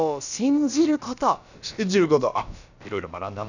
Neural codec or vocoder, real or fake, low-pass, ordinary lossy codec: codec, 16 kHz, about 1 kbps, DyCAST, with the encoder's durations; fake; 7.2 kHz; none